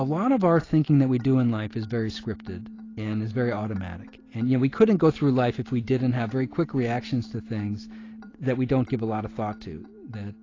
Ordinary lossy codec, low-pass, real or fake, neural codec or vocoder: AAC, 32 kbps; 7.2 kHz; real; none